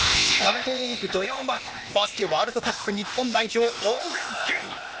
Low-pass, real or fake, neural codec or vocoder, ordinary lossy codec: none; fake; codec, 16 kHz, 0.8 kbps, ZipCodec; none